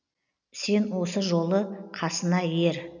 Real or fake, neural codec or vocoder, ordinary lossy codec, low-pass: real; none; none; 7.2 kHz